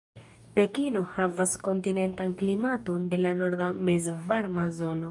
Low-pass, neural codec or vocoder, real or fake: 10.8 kHz; codec, 44.1 kHz, 2.6 kbps, DAC; fake